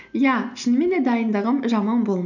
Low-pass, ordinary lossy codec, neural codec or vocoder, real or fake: 7.2 kHz; none; none; real